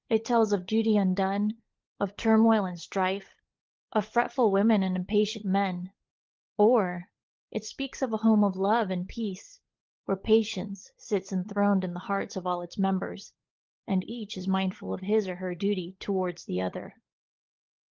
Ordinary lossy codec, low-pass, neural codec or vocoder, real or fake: Opus, 16 kbps; 7.2 kHz; codec, 16 kHz, 16 kbps, FunCodec, trained on LibriTTS, 50 frames a second; fake